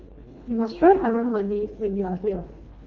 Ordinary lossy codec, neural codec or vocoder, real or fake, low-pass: Opus, 32 kbps; codec, 24 kHz, 1.5 kbps, HILCodec; fake; 7.2 kHz